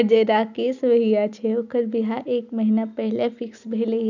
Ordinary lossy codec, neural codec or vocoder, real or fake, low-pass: none; none; real; 7.2 kHz